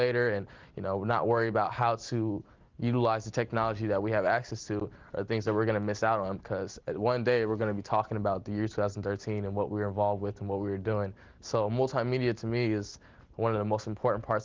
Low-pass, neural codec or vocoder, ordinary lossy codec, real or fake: 7.2 kHz; none; Opus, 32 kbps; real